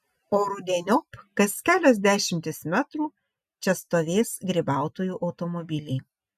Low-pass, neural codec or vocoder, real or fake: 14.4 kHz; none; real